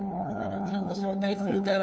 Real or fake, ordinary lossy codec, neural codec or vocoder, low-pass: fake; none; codec, 16 kHz, 2 kbps, FunCodec, trained on LibriTTS, 25 frames a second; none